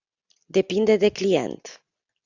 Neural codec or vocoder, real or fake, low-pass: none; real; 7.2 kHz